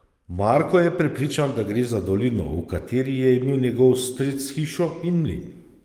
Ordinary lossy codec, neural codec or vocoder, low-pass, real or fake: Opus, 32 kbps; codec, 44.1 kHz, 7.8 kbps, DAC; 19.8 kHz; fake